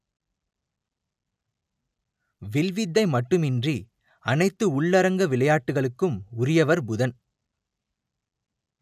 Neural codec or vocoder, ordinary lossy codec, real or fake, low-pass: none; none; real; 14.4 kHz